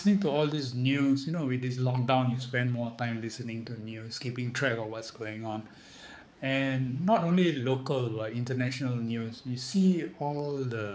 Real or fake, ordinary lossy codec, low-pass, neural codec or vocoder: fake; none; none; codec, 16 kHz, 4 kbps, X-Codec, HuBERT features, trained on balanced general audio